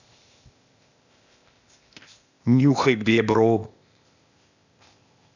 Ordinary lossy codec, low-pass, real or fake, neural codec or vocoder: none; 7.2 kHz; fake; codec, 16 kHz, 0.8 kbps, ZipCodec